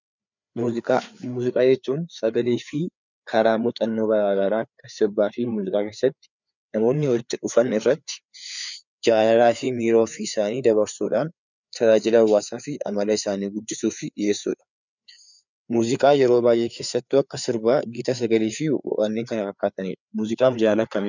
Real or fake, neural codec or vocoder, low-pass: fake; codec, 16 kHz, 4 kbps, FreqCodec, larger model; 7.2 kHz